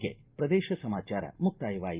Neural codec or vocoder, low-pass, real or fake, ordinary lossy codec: none; 3.6 kHz; real; Opus, 32 kbps